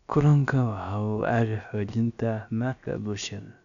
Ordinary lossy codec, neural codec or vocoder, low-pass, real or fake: none; codec, 16 kHz, about 1 kbps, DyCAST, with the encoder's durations; 7.2 kHz; fake